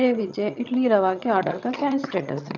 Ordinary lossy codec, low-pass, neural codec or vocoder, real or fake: none; 7.2 kHz; vocoder, 22.05 kHz, 80 mel bands, HiFi-GAN; fake